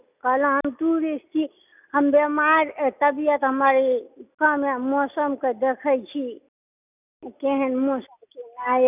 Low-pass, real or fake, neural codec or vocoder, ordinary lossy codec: 3.6 kHz; real; none; none